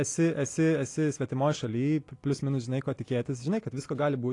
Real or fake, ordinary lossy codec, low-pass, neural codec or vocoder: real; AAC, 48 kbps; 10.8 kHz; none